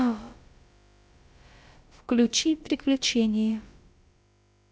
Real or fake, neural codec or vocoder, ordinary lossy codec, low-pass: fake; codec, 16 kHz, about 1 kbps, DyCAST, with the encoder's durations; none; none